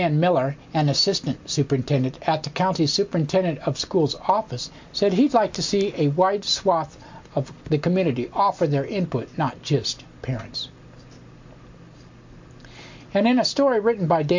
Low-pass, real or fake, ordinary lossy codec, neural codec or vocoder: 7.2 kHz; real; MP3, 48 kbps; none